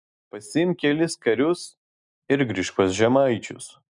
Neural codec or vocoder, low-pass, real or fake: none; 10.8 kHz; real